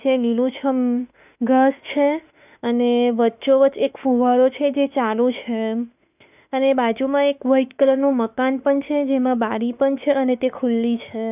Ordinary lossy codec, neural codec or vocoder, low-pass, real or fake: none; autoencoder, 48 kHz, 32 numbers a frame, DAC-VAE, trained on Japanese speech; 3.6 kHz; fake